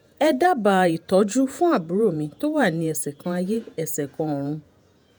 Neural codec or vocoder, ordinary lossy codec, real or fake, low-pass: vocoder, 48 kHz, 128 mel bands, Vocos; none; fake; none